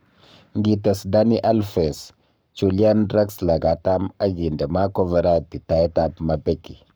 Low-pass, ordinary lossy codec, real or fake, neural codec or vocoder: none; none; fake; codec, 44.1 kHz, 7.8 kbps, Pupu-Codec